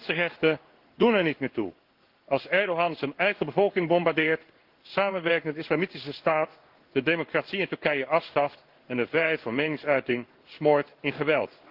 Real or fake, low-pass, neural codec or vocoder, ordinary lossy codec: fake; 5.4 kHz; codec, 16 kHz in and 24 kHz out, 1 kbps, XY-Tokenizer; Opus, 16 kbps